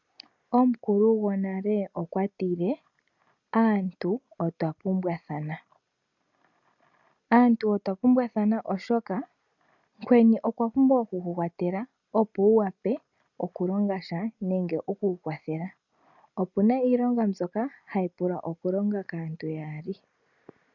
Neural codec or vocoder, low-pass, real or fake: none; 7.2 kHz; real